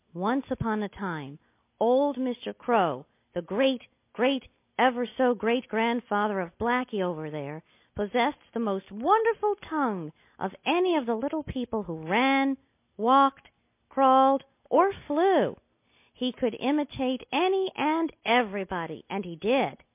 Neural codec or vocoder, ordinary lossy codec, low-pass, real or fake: none; MP3, 24 kbps; 3.6 kHz; real